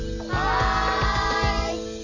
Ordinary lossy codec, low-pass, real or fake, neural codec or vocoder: none; 7.2 kHz; real; none